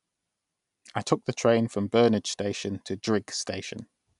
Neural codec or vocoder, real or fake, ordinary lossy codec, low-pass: none; real; none; 10.8 kHz